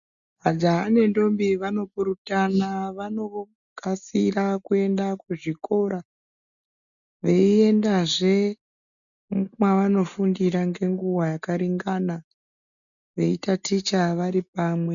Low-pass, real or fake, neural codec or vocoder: 7.2 kHz; real; none